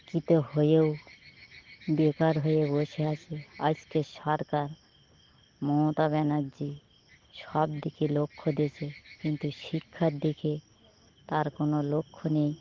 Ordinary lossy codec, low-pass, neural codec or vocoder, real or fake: Opus, 16 kbps; 7.2 kHz; none; real